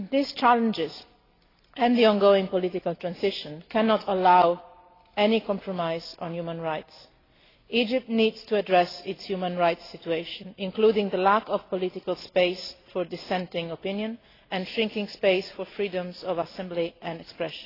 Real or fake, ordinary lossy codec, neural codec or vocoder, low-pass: real; AAC, 24 kbps; none; 5.4 kHz